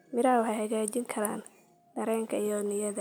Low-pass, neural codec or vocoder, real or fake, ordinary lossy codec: none; none; real; none